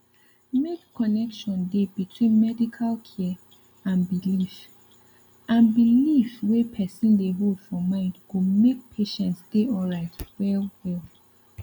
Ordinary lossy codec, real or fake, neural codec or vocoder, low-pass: none; real; none; 19.8 kHz